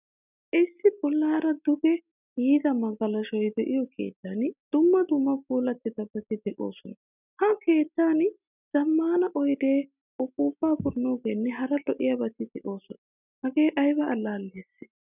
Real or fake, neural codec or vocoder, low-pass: real; none; 3.6 kHz